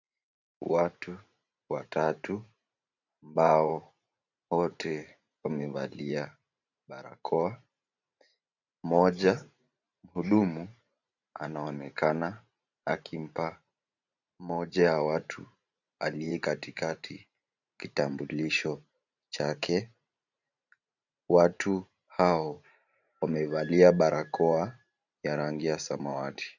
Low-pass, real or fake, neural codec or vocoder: 7.2 kHz; fake; vocoder, 44.1 kHz, 128 mel bands every 512 samples, BigVGAN v2